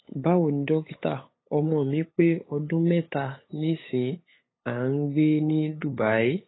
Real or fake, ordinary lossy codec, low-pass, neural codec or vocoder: fake; AAC, 16 kbps; 7.2 kHz; codec, 16 kHz, 8 kbps, FunCodec, trained on LibriTTS, 25 frames a second